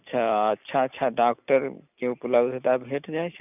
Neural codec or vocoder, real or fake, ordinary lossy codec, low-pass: none; real; none; 3.6 kHz